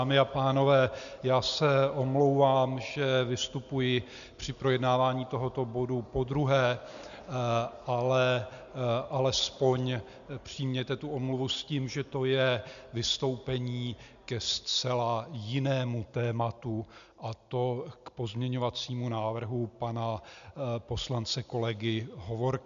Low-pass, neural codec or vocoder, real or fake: 7.2 kHz; none; real